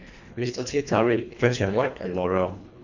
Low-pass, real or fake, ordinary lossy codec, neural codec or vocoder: 7.2 kHz; fake; none; codec, 24 kHz, 1.5 kbps, HILCodec